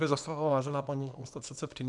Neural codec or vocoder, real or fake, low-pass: codec, 24 kHz, 0.9 kbps, WavTokenizer, small release; fake; 10.8 kHz